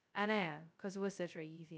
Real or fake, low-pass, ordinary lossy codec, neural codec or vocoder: fake; none; none; codec, 16 kHz, 0.2 kbps, FocalCodec